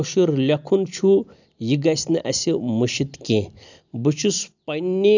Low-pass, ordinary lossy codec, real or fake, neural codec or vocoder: 7.2 kHz; none; real; none